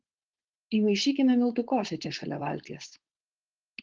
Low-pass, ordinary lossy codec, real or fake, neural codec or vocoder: 7.2 kHz; Opus, 16 kbps; fake; codec, 16 kHz, 4.8 kbps, FACodec